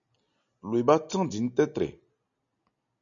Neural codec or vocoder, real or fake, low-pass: none; real; 7.2 kHz